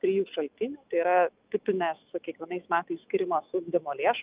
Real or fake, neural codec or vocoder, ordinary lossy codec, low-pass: fake; autoencoder, 48 kHz, 128 numbers a frame, DAC-VAE, trained on Japanese speech; Opus, 24 kbps; 3.6 kHz